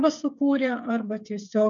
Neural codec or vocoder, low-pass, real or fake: codec, 16 kHz, 8 kbps, FreqCodec, smaller model; 7.2 kHz; fake